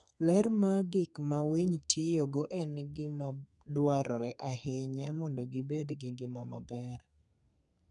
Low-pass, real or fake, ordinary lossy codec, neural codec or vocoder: 10.8 kHz; fake; none; codec, 32 kHz, 1.9 kbps, SNAC